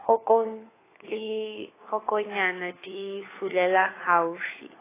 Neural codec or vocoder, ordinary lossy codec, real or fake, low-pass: codec, 16 kHz, 4 kbps, FunCodec, trained on LibriTTS, 50 frames a second; AAC, 16 kbps; fake; 3.6 kHz